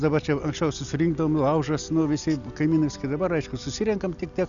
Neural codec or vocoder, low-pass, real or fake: none; 7.2 kHz; real